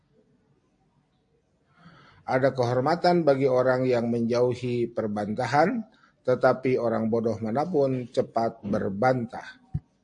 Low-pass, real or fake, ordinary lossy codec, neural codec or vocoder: 9.9 kHz; real; AAC, 64 kbps; none